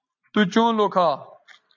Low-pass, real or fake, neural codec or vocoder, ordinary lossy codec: 7.2 kHz; real; none; MP3, 64 kbps